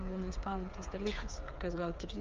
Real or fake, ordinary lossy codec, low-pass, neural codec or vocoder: fake; Opus, 32 kbps; 7.2 kHz; codec, 16 kHz, 8 kbps, FreqCodec, smaller model